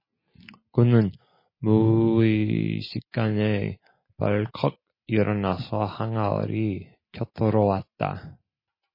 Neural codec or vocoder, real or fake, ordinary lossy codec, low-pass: none; real; MP3, 24 kbps; 5.4 kHz